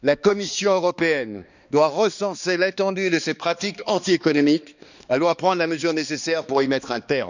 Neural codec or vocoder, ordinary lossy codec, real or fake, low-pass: codec, 16 kHz, 2 kbps, X-Codec, HuBERT features, trained on balanced general audio; none; fake; 7.2 kHz